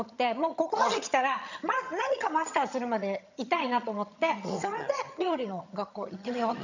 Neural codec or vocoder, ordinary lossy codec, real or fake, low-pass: vocoder, 22.05 kHz, 80 mel bands, HiFi-GAN; none; fake; 7.2 kHz